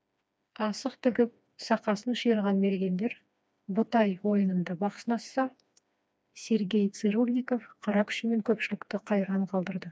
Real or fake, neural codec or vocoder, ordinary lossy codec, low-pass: fake; codec, 16 kHz, 2 kbps, FreqCodec, smaller model; none; none